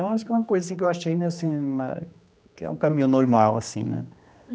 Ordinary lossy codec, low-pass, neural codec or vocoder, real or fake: none; none; codec, 16 kHz, 2 kbps, X-Codec, HuBERT features, trained on general audio; fake